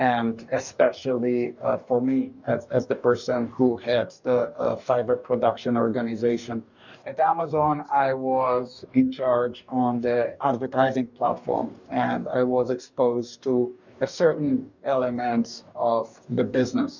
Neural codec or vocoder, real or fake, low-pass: codec, 44.1 kHz, 2.6 kbps, DAC; fake; 7.2 kHz